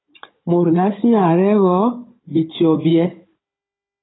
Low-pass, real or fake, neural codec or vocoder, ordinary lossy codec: 7.2 kHz; fake; codec, 16 kHz, 16 kbps, FunCodec, trained on Chinese and English, 50 frames a second; AAC, 16 kbps